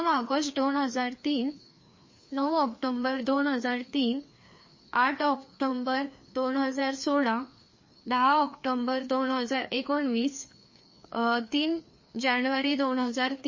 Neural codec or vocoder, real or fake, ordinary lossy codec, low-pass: codec, 16 kHz, 2 kbps, FreqCodec, larger model; fake; MP3, 32 kbps; 7.2 kHz